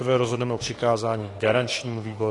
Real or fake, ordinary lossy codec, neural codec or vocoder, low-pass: fake; AAC, 32 kbps; autoencoder, 48 kHz, 32 numbers a frame, DAC-VAE, trained on Japanese speech; 10.8 kHz